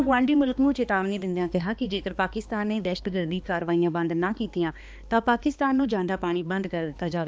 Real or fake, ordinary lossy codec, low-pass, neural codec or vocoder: fake; none; none; codec, 16 kHz, 2 kbps, X-Codec, HuBERT features, trained on balanced general audio